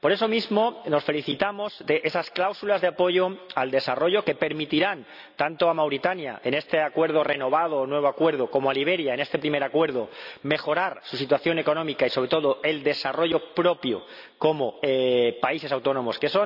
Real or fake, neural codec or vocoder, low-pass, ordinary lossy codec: real; none; 5.4 kHz; none